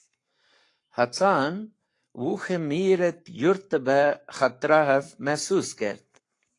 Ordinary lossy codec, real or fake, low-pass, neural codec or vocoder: AAC, 48 kbps; fake; 10.8 kHz; codec, 44.1 kHz, 7.8 kbps, Pupu-Codec